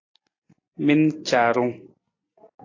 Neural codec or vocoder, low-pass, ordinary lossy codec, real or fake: none; 7.2 kHz; AAC, 32 kbps; real